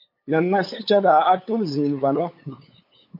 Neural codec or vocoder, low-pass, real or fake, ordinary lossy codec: codec, 16 kHz, 8 kbps, FunCodec, trained on LibriTTS, 25 frames a second; 5.4 kHz; fake; MP3, 32 kbps